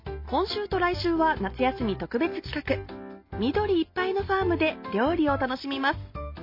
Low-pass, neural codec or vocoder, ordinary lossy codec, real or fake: 5.4 kHz; none; MP3, 32 kbps; real